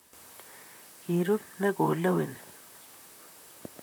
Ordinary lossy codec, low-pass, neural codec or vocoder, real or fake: none; none; vocoder, 44.1 kHz, 128 mel bands, Pupu-Vocoder; fake